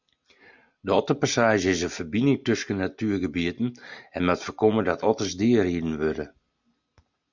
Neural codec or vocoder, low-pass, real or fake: none; 7.2 kHz; real